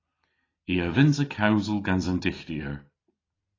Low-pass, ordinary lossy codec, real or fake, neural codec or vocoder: 7.2 kHz; AAC, 32 kbps; real; none